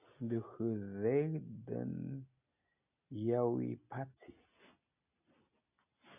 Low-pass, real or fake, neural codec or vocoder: 3.6 kHz; real; none